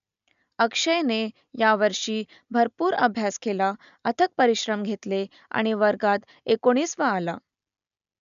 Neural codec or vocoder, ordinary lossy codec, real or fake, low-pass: none; none; real; 7.2 kHz